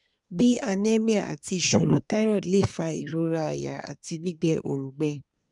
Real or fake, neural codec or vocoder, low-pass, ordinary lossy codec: fake; codec, 24 kHz, 1 kbps, SNAC; 10.8 kHz; none